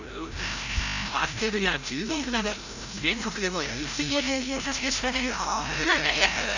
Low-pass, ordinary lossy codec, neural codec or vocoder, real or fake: 7.2 kHz; none; codec, 16 kHz, 0.5 kbps, FreqCodec, larger model; fake